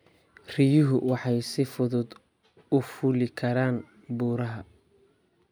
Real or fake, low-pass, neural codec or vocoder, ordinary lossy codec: real; none; none; none